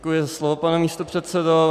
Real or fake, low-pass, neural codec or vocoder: real; 14.4 kHz; none